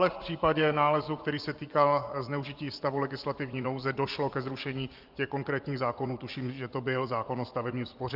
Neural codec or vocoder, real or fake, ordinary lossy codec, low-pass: vocoder, 24 kHz, 100 mel bands, Vocos; fake; Opus, 24 kbps; 5.4 kHz